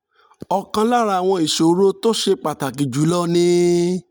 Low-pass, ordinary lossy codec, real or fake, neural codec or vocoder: none; none; real; none